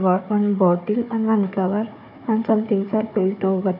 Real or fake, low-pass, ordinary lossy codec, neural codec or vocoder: fake; 5.4 kHz; none; codec, 16 kHz, 4 kbps, FunCodec, trained on Chinese and English, 50 frames a second